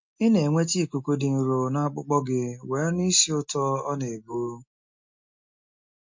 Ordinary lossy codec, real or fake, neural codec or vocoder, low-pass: MP3, 48 kbps; real; none; 7.2 kHz